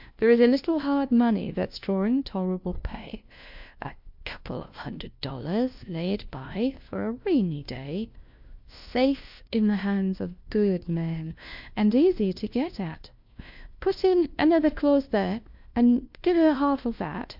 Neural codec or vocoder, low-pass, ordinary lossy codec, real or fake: codec, 16 kHz, 1 kbps, FunCodec, trained on LibriTTS, 50 frames a second; 5.4 kHz; MP3, 48 kbps; fake